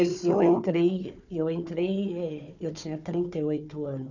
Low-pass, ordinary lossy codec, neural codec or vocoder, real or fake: 7.2 kHz; none; codec, 16 kHz, 4 kbps, FunCodec, trained on Chinese and English, 50 frames a second; fake